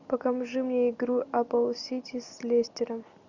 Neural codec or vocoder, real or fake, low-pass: none; real; 7.2 kHz